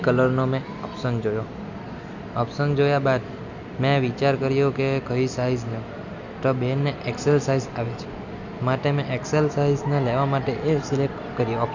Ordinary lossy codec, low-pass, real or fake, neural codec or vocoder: none; 7.2 kHz; real; none